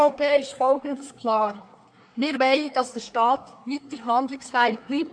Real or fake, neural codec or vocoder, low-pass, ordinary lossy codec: fake; codec, 24 kHz, 1 kbps, SNAC; 9.9 kHz; AAC, 64 kbps